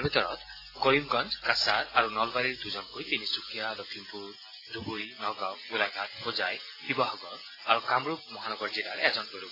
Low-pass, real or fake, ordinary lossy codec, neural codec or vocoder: 5.4 kHz; real; AAC, 24 kbps; none